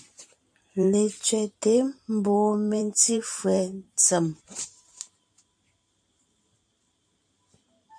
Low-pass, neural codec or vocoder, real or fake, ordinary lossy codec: 9.9 kHz; vocoder, 44.1 kHz, 128 mel bands every 512 samples, BigVGAN v2; fake; AAC, 64 kbps